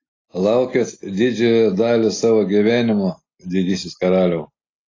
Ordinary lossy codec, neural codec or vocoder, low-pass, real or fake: AAC, 32 kbps; none; 7.2 kHz; real